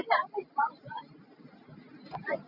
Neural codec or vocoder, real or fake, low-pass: none; real; 5.4 kHz